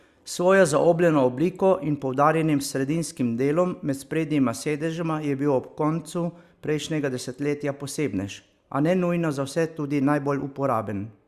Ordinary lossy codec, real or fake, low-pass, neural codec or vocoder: Opus, 64 kbps; real; 14.4 kHz; none